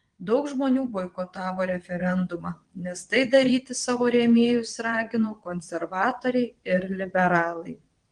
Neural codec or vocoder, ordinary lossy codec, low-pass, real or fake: vocoder, 22.05 kHz, 80 mel bands, WaveNeXt; Opus, 24 kbps; 9.9 kHz; fake